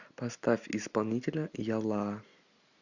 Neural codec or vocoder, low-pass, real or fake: none; 7.2 kHz; real